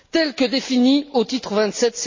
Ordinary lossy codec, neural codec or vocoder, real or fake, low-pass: MP3, 32 kbps; none; real; 7.2 kHz